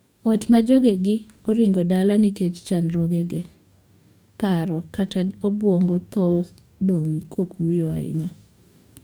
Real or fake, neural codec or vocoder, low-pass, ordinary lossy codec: fake; codec, 44.1 kHz, 2.6 kbps, DAC; none; none